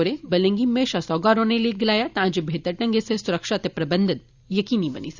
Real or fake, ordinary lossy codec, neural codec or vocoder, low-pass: fake; Opus, 64 kbps; vocoder, 44.1 kHz, 128 mel bands every 256 samples, BigVGAN v2; 7.2 kHz